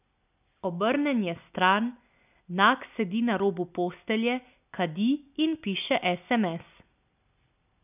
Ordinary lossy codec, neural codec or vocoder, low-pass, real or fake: none; none; 3.6 kHz; real